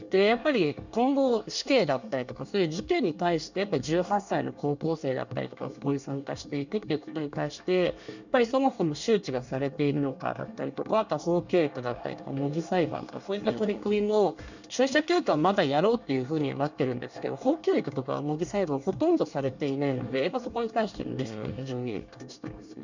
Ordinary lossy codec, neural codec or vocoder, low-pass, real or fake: none; codec, 24 kHz, 1 kbps, SNAC; 7.2 kHz; fake